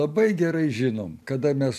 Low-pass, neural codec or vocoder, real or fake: 14.4 kHz; none; real